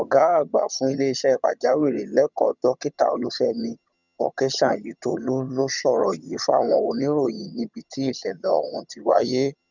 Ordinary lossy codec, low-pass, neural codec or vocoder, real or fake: none; 7.2 kHz; vocoder, 22.05 kHz, 80 mel bands, HiFi-GAN; fake